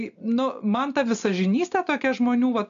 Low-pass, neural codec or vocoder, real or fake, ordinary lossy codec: 7.2 kHz; none; real; MP3, 64 kbps